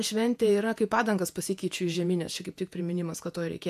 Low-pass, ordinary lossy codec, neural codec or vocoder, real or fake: 14.4 kHz; AAC, 96 kbps; vocoder, 48 kHz, 128 mel bands, Vocos; fake